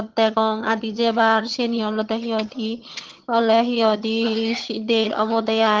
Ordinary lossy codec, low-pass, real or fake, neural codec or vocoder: Opus, 16 kbps; 7.2 kHz; fake; vocoder, 22.05 kHz, 80 mel bands, HiFi-GAN